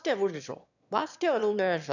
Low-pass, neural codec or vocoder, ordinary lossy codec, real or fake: 7.2 kHz; autoencoder, 22.05 kHz, a latent of 192 numbers a frame, VITS, trained on one speaker; none; fake